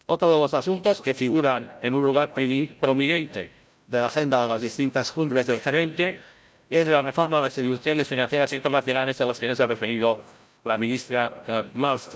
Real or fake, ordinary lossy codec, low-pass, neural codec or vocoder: fake; none; none; codec, 16 kHz, 0.5 kbps, FreqCodec, larger model